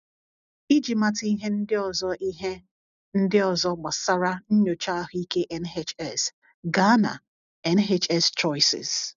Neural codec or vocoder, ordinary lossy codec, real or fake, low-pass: none; none; real; 7.2 kHz